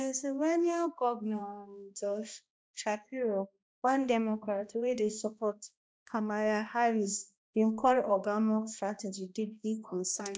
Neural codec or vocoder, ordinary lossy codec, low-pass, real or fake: codec, 16 kHz, 1 kbps, X-Codec, HuBERT features, trained on balanced general audio; none; none; fake